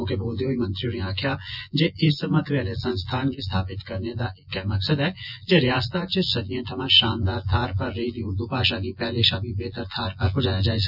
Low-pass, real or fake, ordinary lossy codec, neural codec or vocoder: 5.4 kHz; fake; none; vocoder, 24 kHz, 100 mel bands, Vocos